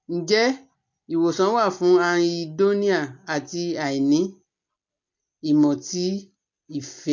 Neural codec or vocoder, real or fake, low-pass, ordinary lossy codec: none; real; 7.2 kHz; MP3, 48 kbps